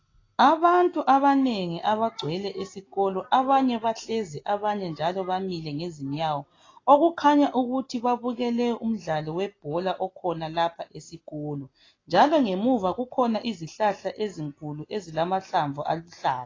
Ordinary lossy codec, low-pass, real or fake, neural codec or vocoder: AAC, 32 kbps; 7.2 kHz; real; none